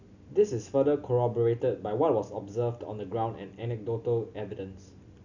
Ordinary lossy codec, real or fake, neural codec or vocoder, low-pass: none; real; none; 7.2 kHz